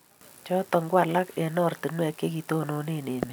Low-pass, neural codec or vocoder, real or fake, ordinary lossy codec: none; none; real; none